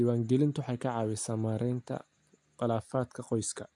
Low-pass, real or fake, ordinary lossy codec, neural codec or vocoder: 10.8 kHz; real; AAC, 64 kbps; none